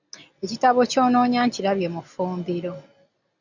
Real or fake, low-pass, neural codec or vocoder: real; 7.2 kHz; none